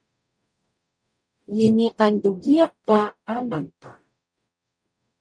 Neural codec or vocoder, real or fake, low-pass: codec, 44.1 kHz, 0.9 kbps, DAC; fake; 9.9 kHz